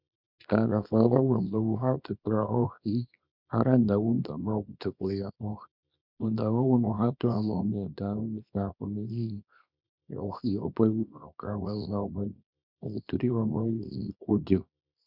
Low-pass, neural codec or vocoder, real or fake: 5.4 kHz; codec, 24 kHz, 0.9 kbps, WavTokenizer, small release; fake